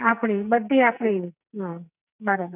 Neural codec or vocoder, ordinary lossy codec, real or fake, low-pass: codec, 44.1 kHz, 2.6 kbps, SNAC; none; fake; 3.6 kHz